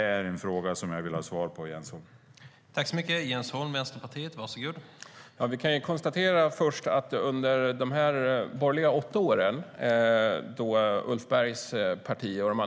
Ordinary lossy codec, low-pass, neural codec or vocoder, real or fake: none; none; none; real